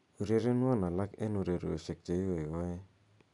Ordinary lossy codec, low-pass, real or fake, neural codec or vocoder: none; 10.8 kHz; real; none